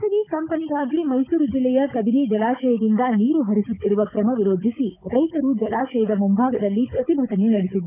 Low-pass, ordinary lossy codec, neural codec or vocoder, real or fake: 3.6 kHz; none; codec, 16 kHz, 16 kbps, FunCodec, trained on Chinese and English, 50 frames a second; fake